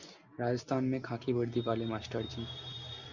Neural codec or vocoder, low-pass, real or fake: none; 7.2 kHz; real